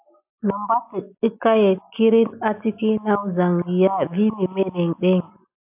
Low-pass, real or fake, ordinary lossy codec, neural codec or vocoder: 3.6 kHz; real; AAC, 32 kbps; none